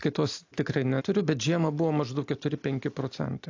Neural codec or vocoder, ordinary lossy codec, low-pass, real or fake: none; AAC, 48 kbps; 7.2 kHz; real